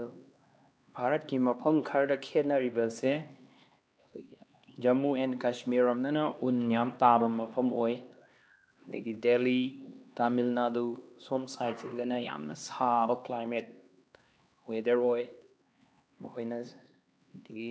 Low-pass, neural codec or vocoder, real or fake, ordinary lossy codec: none; codec, 16 kHz, 2 kbps, X-Codec, HuBERT features, trained on LibriSpeech; fake; none